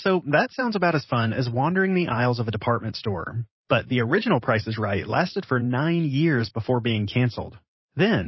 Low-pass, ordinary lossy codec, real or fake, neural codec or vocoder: 7.2 kHz; MP3, 24 kbps; fake; vocoder, 44.1 kHz, 128 mel bands every 512 samples, BigVGAN v2